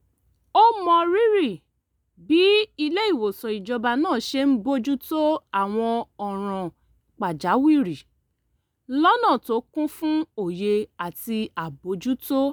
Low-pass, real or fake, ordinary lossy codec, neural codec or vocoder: none; real; none; none